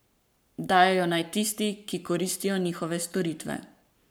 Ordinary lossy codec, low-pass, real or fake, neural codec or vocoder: none; none; fake; codec, 44.1 kHz, 7.8 kbps, Pupu-Codec